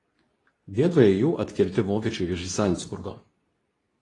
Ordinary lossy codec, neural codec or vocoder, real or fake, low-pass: AAC, 32 kbps; codec, 24 kHz, 0.9 kbps, WavTokenizer, medium speech release version 2; fake; 10.8 kHz